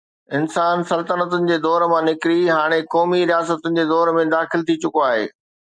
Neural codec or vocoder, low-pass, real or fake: none; 9.9 kHz; real